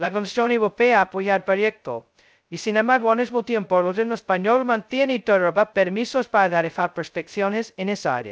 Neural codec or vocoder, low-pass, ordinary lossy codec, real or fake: codec, 16 kHz, 0.2 kbps, FocalCodec; none; none; fake